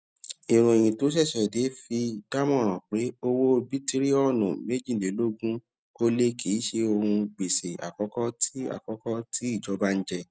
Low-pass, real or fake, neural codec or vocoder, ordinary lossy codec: none; real; none; none